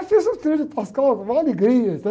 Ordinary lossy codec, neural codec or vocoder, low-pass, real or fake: none; none; none; real